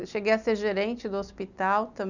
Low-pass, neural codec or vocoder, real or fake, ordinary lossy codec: 7.2 kHz; none; real; none